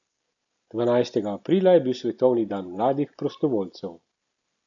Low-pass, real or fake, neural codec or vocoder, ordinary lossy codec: 7.2 kHz; real; none; none